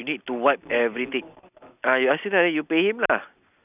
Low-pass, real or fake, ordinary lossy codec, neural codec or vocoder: 3.6 kHz; real; none; none